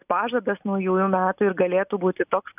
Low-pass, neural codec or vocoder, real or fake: 3.6 kHz; none; real